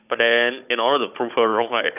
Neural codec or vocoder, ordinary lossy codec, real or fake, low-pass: codec, 16 kHz, 16 kbps, FunCodec, trained on Chinese and English, 50 frames a second; none; fake; 3.6 kHz